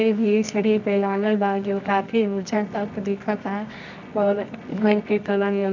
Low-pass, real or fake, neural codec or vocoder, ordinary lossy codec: 7.2 kHz; fake; codec, 24 kHz, 0.9 kbps, WavTokenizer, medium music audio release; none